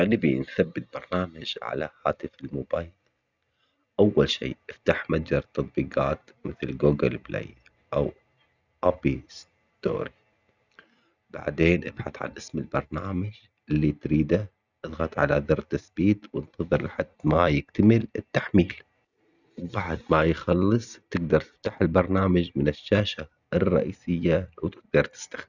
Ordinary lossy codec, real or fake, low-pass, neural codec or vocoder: none; real; 7.2 kHz; none